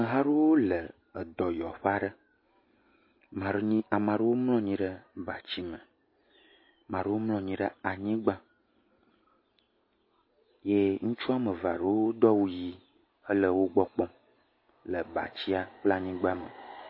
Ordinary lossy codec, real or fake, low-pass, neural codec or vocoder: MP3, 24 kbps; real; 5.4 kHz; none